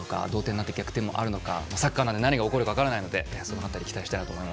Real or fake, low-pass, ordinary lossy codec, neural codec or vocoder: real; none; none; none